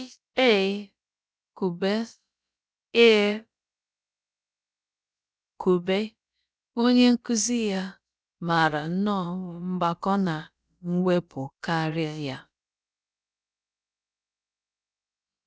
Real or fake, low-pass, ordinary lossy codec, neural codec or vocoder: fake; none; none; codec, 16 kHz, about 1 kbps, DyCAST, with the encoder's durations